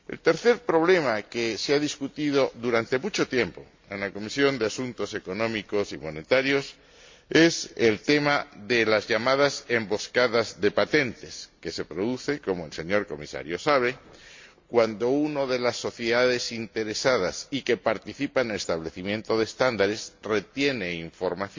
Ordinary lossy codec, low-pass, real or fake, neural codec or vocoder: MP3, 48 kbps; 7.2 kHz; real; none